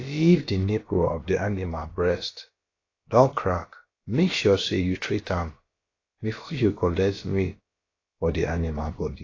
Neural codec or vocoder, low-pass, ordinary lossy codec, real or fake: codec, 16 kHz, about 1 kbps, DyCAST, with the encoder's durations; 7.2 kHz; AAC, 32 kbps; fake